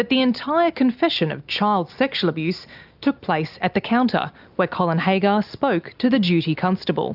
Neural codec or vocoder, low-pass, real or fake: none; 5.4 kHz; real